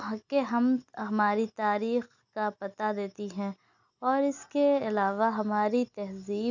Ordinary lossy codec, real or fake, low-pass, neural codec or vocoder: none; real; 7.2 kHz; none